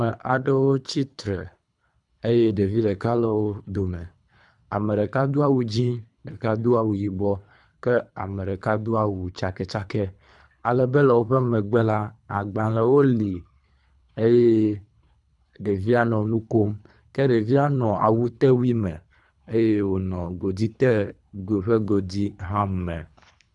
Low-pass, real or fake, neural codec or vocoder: 10.8 kHz; fake; codec, 24 kHz, 3 kbps, HILCodec